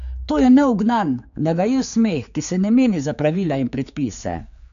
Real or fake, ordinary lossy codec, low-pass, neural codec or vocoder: fake; none; 7.2 kHz; codec, 16 kHz, 4 kbps, X-Codec, HuBERT features, trained on general audio